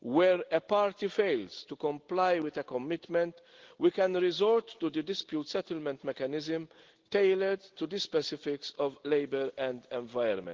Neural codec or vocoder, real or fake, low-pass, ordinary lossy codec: none; real; 7.2 kHz; Opus, 16 kbps